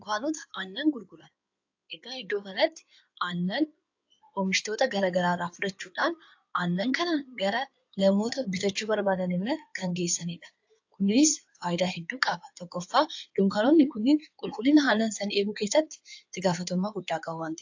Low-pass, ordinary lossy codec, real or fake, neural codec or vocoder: 7.2 kHz; AAC, 48 kbps; fake; codec, 16 kHz in and 24 kHz out, 2.2 kbps, FireRedTTS-2 codec